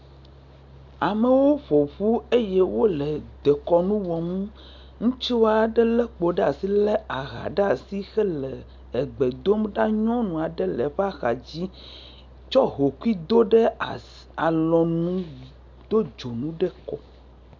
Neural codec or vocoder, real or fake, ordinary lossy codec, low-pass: none; real; MP3, 64 kbps; 7.2 kHz